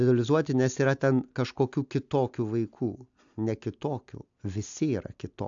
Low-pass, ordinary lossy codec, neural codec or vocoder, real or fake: 7.2 kHz; AAC, 64 kbps; none; real